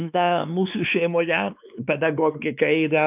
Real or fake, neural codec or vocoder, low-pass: fake; codec, 16 kHz, 2 kbps, X-Codec, WavLM features, trained on Multilingual LibriSpeech; 3.6 kHz